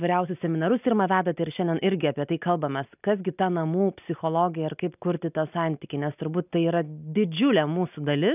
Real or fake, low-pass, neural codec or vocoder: real; 3.6 kHz; none